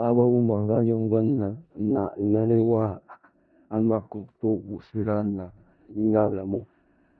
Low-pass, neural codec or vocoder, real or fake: 10.8 kHz; codec, 16 kHz in and 24 kHz out, 0.4 kbps, LongCat-Audio-Codec, four codebook decoder; fake